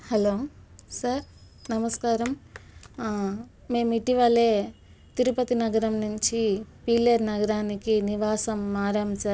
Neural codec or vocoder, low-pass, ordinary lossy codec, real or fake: none; none; none; real